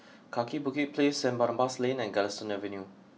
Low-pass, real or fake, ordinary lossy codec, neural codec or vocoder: none; real; none; none